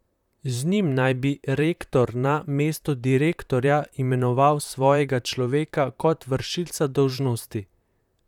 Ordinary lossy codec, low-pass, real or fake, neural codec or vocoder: none; 19.8 kHz; fake; vocoder, 44.1 kHz, 128 mel bands, Pupu-Vocoder